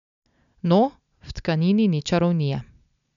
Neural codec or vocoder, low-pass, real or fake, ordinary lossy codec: none; 7.2 kHz; real; none